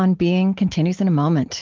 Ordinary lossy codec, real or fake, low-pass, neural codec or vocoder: Opus, 16 kbps; real; 7.2 kHz; none